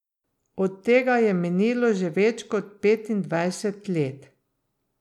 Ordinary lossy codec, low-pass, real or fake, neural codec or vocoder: none; 19.8 kHz; real; none